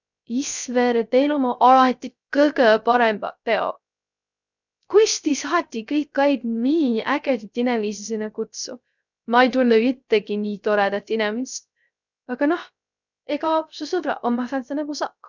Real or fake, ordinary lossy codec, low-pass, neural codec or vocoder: fake; none; 7.2 kHz; codec, 16 kHz, 0.3 kbps, FocalCodec